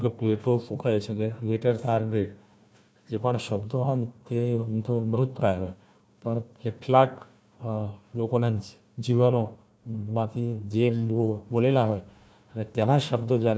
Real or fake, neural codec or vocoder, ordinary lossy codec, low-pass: fake; codec, 16 kHz, 1 kbps, FunCodec, trained on Chinese and English, 50 frames a second; none; none